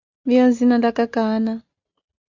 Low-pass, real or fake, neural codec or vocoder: 7.2 kHz; real; none